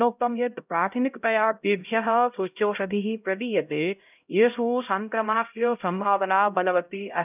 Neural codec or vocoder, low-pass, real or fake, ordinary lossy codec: codec, 16 kHz, 0.5 kbps, X-Codec, HuBERT features, trained on LibriSpeech; 3.6 kHz; fake; none